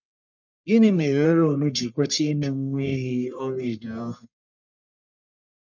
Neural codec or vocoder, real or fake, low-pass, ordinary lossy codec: codec, 44.1 kHz, 1.7 kbps, Pupu-Codec; fake; 7.2 kHz; none